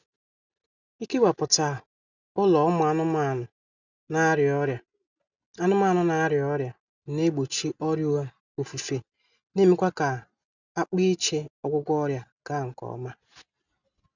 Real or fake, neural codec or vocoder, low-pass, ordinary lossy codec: real; none; 7.2 kHz; none